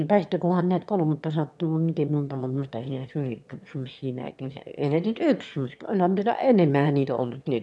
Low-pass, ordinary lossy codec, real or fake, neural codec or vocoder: none; none; fake; autoencoder, 22.05 kHz, a latent of 192 numbers a frame, VITS, trained on one speaker